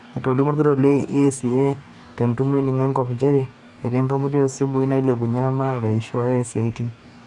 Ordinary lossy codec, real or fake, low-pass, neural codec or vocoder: none; fake; 10.8 kHz; codec, 44.1 kHz, 2.6 kbps, DAC